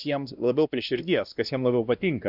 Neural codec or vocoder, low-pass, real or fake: codec, 16 kHz, 1 kbps, X-Codec, WavLM features, trained on Multilingual LibriSpeech; 5.4 kHz; fake